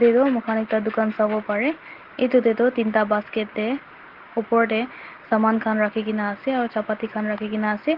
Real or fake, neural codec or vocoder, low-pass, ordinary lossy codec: real; none; 5.4 kHz; Opus, 16 kbps